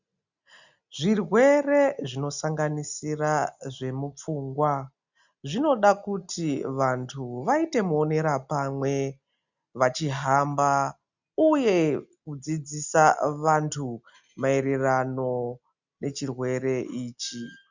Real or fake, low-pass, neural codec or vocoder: real; 7.2 kHz; none